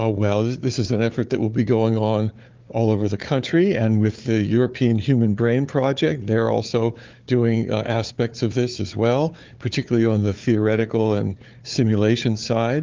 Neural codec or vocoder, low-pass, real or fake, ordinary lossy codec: codec, 16 kHz, 4 kbps, FunCodec, trained on Chinese and English, 50 frames a second; 7.2 kHz; fake; Opus, 24 kbps